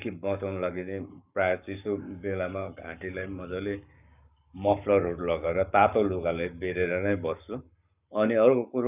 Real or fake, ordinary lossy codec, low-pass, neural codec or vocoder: fake; none; 3.6 kHz; codec, 44.1 kHz, 7.8 kbps, Pupu-Codec